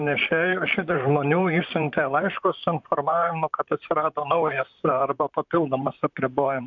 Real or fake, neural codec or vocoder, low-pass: fake; vocoder, 44.1 kHz, 128 mel bands, Pupu-Vocoder; 7.2 kHz